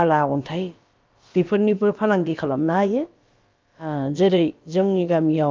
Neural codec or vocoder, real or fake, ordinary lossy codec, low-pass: codec, 16 kHz, about 1 kbps, DyCAST, with the encoder's durations; fake; Opus, 24 kbps; 7.2 kHz